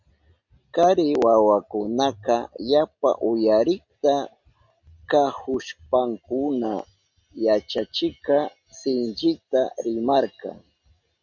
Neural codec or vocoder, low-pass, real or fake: none; 7.2 kHz; real